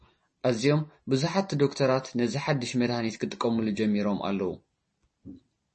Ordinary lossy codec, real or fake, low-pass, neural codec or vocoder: MP3, 32 kbps; real; 10.8 kHz; none